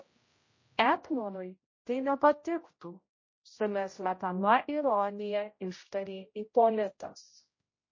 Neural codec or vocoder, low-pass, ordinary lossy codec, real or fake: codec, 16 kHz, 0.5 kbps, X-Codec, HuBERT features, trained on general audio; 7.2 kHz; MP3, 32 kbps; fake